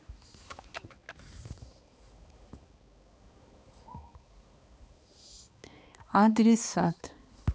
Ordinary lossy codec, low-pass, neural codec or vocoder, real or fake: none; none; codec, 16 kHz, 2 kbps, X-Codec, HuBERT features, trained on balanced general audio; fake